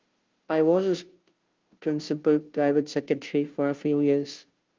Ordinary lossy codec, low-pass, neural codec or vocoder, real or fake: Opus, 32 kbps; 7.2 kHz; codec, 16 kHz, 0.5 kbps, FunCodec, trained on Chinese and English, 25 frames a second; fake